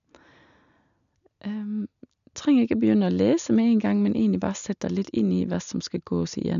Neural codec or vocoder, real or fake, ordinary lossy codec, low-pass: none; real; none; 7.2 kHz